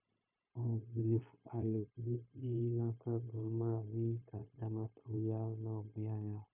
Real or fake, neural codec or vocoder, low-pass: fake; codec, 16 kHz, 0.9 kbps, LongCat-Audio-Codec; 3.6 kHz